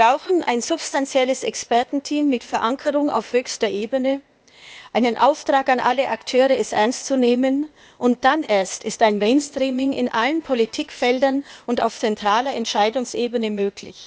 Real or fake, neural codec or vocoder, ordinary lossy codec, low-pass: fake; codec, 16 kHz, 0.8 kbps, ZipCodec; none; none